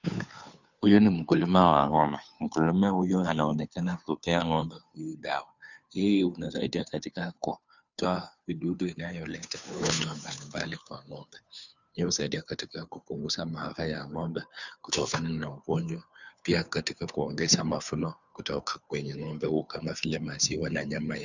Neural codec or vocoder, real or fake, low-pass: codec, 16 kHz, 2 kbps, FunCodec, trained on Chinese and English, 25 frames a second; fake; 7.2 kHz